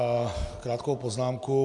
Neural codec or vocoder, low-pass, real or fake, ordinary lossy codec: none; 10.8 kHz; real; AAC, 64 kbps